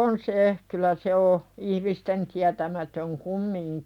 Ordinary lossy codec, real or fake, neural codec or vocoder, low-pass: none; real; none; 19.8 kHz